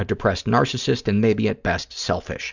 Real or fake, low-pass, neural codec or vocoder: real; 7.2 kHz; none